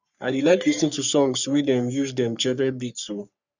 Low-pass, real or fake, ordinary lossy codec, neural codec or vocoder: 7.2 kHz; fake; none; codec, 44.1 kHz, 3.4 kbps, Pupu-Codec